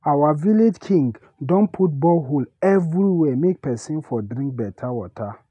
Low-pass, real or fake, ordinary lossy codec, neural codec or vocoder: 10.8 kHz; real; none; none